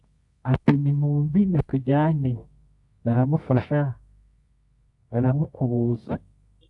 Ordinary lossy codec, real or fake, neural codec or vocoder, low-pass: AAC, 64 kbps; fake; codec, 24 kHz, 0.9 kbps, WavTokenizer, medium music audio release; 10.8 kHz